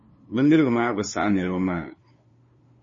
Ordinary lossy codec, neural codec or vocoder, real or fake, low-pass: MP3, 32 kbps; codec, 16 kHz, 2 kbps, FunCodec, trained on LibriTTS, 25 frames a second; fake; 7.2 kHz